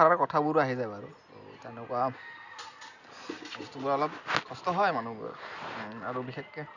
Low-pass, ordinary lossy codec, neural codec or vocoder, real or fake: 7.2 kHz; none; none; real